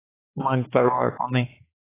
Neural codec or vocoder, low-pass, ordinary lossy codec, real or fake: codec, 16 kHz, 4 kbps, X-Codec, HuBERT features, trained on balanced general audio; 3.6 kHz; AAC, 16 kbps; fake